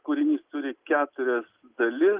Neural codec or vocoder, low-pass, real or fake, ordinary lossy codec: none; 3.6 kHz; real; Opus, 24 kbps